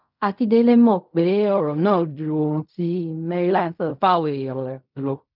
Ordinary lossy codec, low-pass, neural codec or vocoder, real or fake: none; 5.4 kHz; codec, 16 kHz in and 24 kHz out, 0.4 kbps, LongCat-Audio-Codec, fine tuned four codebook decoder; fake